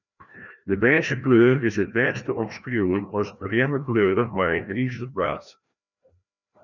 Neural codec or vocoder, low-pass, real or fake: codec, 16 kHz, 1 kbps, FreqCodec, larger model; 7.2 kHz; fake